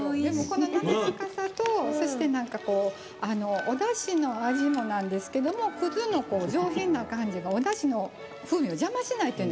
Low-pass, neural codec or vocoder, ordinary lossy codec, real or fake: none; none; none; real